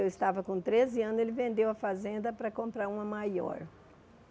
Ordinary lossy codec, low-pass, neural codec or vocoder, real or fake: none; none; none; real